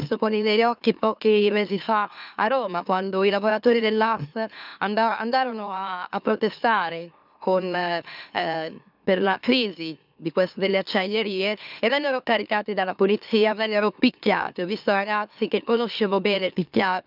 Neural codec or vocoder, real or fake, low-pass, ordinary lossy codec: autoencoder, 44.1 kHz, a latent of 192 numbers a frame, MeloTTS; fake; 5.4 kHz; none